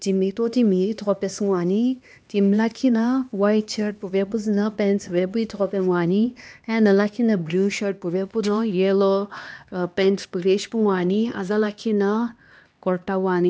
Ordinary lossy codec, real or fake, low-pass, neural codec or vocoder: none; fake; none; codec, 16 kHz, 2 kbps, X-Codec, HuBERT features, trained on LibriSpeech